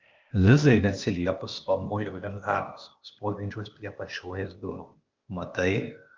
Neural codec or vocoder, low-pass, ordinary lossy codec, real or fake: codec, 16 kHz, 0.8 kbps, ZipCodec; 7.2 kHz; Opus, 32 kbps; fake